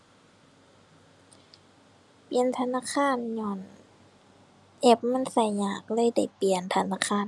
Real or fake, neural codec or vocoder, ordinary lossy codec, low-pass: real; none; none; none